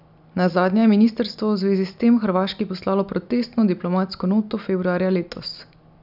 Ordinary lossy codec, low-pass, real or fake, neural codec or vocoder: none; 5.4 kHz; real; none